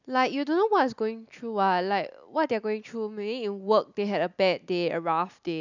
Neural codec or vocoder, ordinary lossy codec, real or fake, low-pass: none; none; real; 7.2 kHz